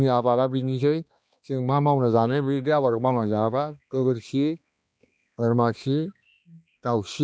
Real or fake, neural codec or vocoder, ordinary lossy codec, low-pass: fake; codec, 16 kHz, 2 kbps, X-Codec, HuBERT features, trained on balanced general audio; none; none